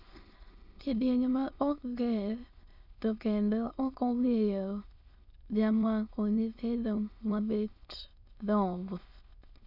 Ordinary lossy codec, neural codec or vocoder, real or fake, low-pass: none; autoencoder, 22.05 kHz, a latent of 192 numbers a frame, VITS, trained on many speakers; fake; 5.4 kHz